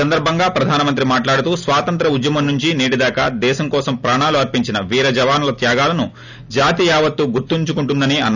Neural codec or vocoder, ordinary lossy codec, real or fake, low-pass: none; none; real; 7.2 kHz